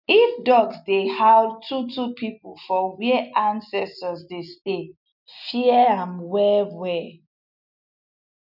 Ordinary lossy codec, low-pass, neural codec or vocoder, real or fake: none; 5.4 kHz; none; real